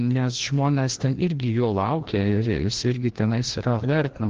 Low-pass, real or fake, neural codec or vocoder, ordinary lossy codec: 7.2 kHz; fake; codec, 16 kHz, 1 kbps, FreqCodec, larger model; Opus, 16 kbps